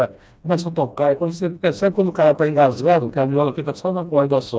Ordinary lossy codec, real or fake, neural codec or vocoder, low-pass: none; fake; codec, 16 kHz, 1 kbps, FreqCodec, smaller model; none